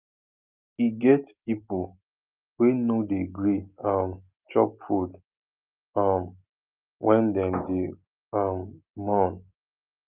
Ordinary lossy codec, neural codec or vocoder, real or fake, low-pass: Opus, 24 kbps; none; real; 3.6 kHz